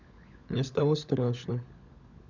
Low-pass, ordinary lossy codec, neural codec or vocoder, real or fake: 7.2 kHz; none; codec, 16 kHz, 16 kbps, FunCodec, trained on LibriTTS, 50 frames a second; fake